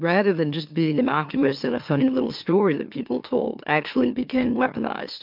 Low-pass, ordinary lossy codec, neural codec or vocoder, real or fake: 5.4 kHz; MP3, 48 kbps; autoencoder, 44.1 kHz, a latent of 192 numbers a frame, MeloTTS; fake